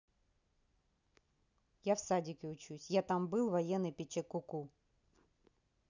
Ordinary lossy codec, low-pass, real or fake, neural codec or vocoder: none; 7.2 kHz; real; none